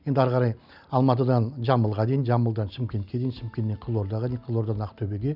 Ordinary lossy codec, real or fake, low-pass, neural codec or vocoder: none; real; 5.4 kHz; none